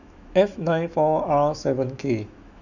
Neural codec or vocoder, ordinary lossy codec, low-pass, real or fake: autoencoder, 48 kHz, 128 numbers a frame, DAC-VAE, trained on Japanese speech; none; 7.2 kHz; fake